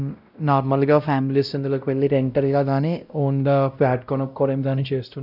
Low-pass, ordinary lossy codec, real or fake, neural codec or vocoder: 5.4 kHz; none; fake; codec, 16 kHz, 0.5 kbps, X-Codec, WavLM features, trained on Multilingual LibriSpeech